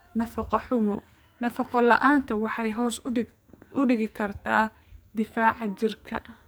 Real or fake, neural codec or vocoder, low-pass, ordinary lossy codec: fake; codec, 44.1 kHz, 2.6 kbps, SNAC; none; none